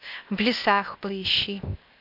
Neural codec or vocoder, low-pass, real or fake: codec, 16 kHz, 0.8 kbps, ZipCodec; 5.4 kHz; fake